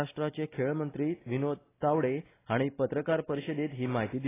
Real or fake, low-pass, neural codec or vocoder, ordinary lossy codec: real; 3.6 kHz; none; AAC, 16 kbps